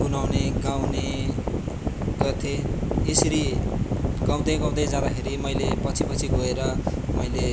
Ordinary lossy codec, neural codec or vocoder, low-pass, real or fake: none; none; none; real